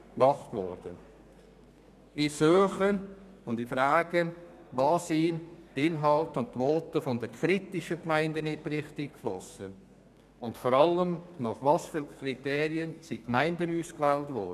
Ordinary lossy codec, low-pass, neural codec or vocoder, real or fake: none; 14.4 kHz; codec, 32 kHz, 1.9 kbps, SNAC; fake